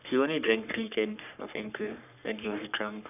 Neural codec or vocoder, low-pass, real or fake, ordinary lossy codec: codec, 44.1 kHz, 3.4 kbps, Pupu-Codec; 3.6 kHz; fake; none